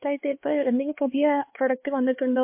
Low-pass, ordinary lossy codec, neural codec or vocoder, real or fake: 3.6 kHz; MP3, 24 kbps; codec, 16 kHz, 4 kbps, X-Codec, HuBERT features, trained on LibriSpeech; fake